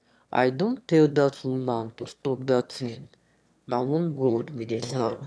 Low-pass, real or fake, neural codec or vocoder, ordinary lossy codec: none; fake; autoencoder, 22.05 kHz, a latent of 192 numbers a frame, VITS, trained on one speaker; none